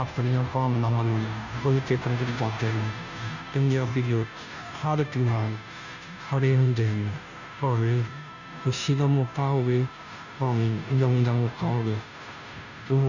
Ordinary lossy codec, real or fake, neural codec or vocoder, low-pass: none; fake; codec, 16 kHz, 0.5 kbps, FunCodec, trained on Chinese and English, 25 frames a second; 7.2 kHz